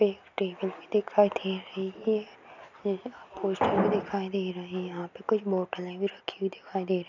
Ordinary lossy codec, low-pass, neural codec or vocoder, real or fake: none; 7.2 kHz; none; real